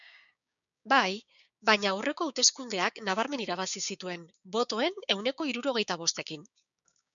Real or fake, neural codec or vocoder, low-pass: fake; codec, 16 kHz, 6 kbps, DAC; 7.2 kHz